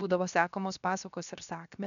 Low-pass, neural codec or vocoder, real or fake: 7.2 kHz; codec, 16 kHz, 0.7 kbps, FocalCodec; fake